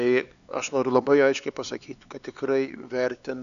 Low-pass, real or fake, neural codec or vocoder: 7.2 kHz; fake; codec, 16 kHz, 4 kbps, X-Codec, HuBERT features, trained on LibriSpeech